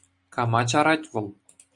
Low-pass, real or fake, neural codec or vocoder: 10.8 kHz; real; none